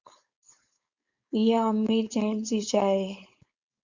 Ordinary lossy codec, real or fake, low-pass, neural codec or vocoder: Opus, 64 kbps; fake; 7.2 kHz; codec, 16 kHz, 4.8 kbps, FACodec